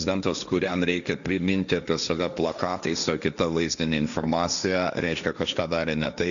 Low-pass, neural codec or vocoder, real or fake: 7.2 kHz; codec, 16 kHz, 1.1 kbps, Voila-Tokenizer; fake